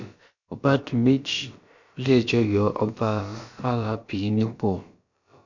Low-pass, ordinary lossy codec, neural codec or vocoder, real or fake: 7.2 kHz; none; codec, 16 kHz, about 1 kbps, DyCAST, with the encoder's durations; fake